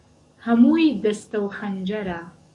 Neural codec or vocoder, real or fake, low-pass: codec, 44.1 kHz, 7.8 kbps, DAC; fake; 10.8 kHz